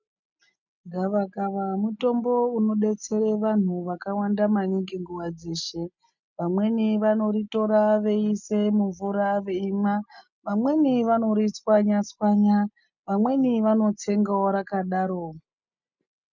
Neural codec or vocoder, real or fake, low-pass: none; real; 7.2 kHz